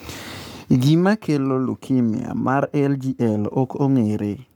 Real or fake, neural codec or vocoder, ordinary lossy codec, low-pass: fake; codec, 44.1 kHz, 7.8 kbps, Pupu-Codec; none; none